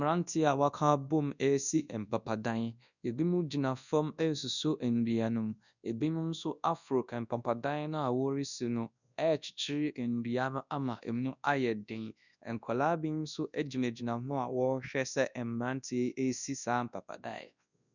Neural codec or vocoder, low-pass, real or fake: codec, 24 kHz, 0.9 kbps, WavTokenizer, large speech release; 7.2 kHz; fake